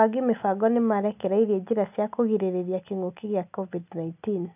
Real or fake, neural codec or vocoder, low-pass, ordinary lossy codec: real; none; 3.6 kHz; none